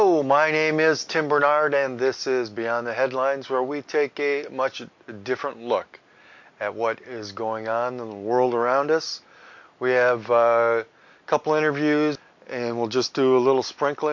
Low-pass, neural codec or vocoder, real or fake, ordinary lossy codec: 7.2 kHz; none; real; MP3, 48 kbps